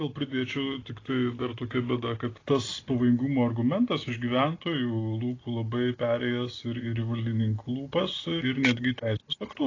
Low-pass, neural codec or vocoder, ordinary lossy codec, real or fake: 7.2 kHz; none; AAC, 32 kbps; real